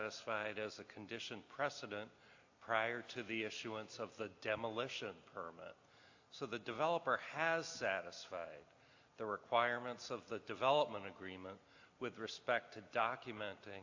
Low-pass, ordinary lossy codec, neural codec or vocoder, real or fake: 7.2 kHz; AAC, 48 kbps; none; real